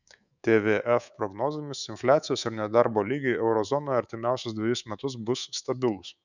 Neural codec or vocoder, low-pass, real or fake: codec, 24 kHz, 3.1 kbps, DualCodec; 7.2 kHz; fake